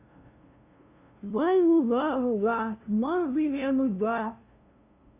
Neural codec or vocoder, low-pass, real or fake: codec, 16 kHz, 0.5 kbps, FunCodec, trained on LibriTTS, 25 frames a second; 3.6 kHz; fake